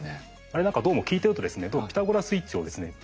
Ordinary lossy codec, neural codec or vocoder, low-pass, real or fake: none; none; none; real